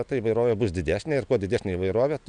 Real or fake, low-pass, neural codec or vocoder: real; 9.9 kHz; none